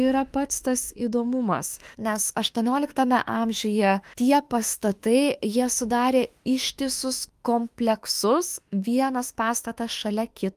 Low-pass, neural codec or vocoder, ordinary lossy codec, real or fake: 14.4 kHz; autoencoder, 48 kHz, 32 numbers a frame, DAC-VAE, trained on Japanese speech; Opus, 32 kbps; fake